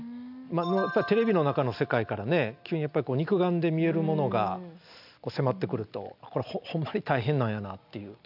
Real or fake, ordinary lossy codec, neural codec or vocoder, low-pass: real; none; none; 5.4 kHz